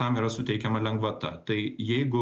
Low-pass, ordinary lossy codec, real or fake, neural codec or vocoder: 7.2 kHz; Opus, 24 kbps; real; none